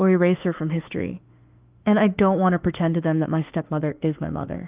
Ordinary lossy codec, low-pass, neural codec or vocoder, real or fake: Opus, 32 kbps; 3.6 kHz; autoencoder, 48 kHz, 32 numbers a frame, DAC-VAE, trained on Japanese speech; fake